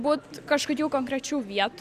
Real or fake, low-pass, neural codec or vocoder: real; 14.4 kHz; none